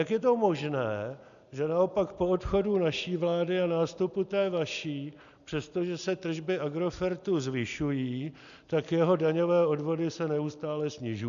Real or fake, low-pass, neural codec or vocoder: real; 7.2 kHz; none